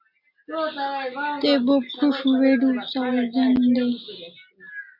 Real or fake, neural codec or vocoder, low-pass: real; none; 5.4 kHz